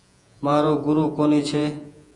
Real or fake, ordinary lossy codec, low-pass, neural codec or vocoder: fake; AAC, 64 kbps; 10.8 kHz; vocoder, 48 kHz, 128 mel bands, Vocos